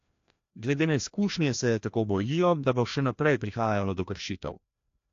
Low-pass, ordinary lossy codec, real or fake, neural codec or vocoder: 7.2 kHz; AAC, 48 kbps; fake; codec, 16 kHz, 1 kbps, FreqCodec, larger model